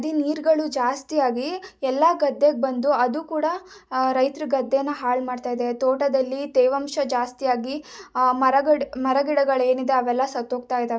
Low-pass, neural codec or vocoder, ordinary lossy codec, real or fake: none; none; none; real